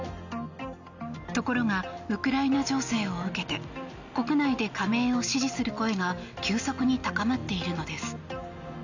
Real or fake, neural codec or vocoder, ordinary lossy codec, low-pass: real; none; none; 7.2 kHz